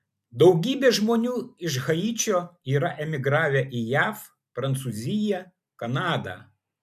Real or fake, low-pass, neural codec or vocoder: real; 14.4 kHz; none